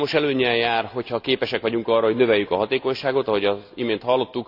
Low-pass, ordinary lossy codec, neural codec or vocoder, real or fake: 5.4 kHz; none; none; real